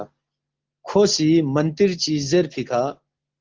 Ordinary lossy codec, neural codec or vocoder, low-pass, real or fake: Opus, 16 kbps; none; 7.2 kHz; real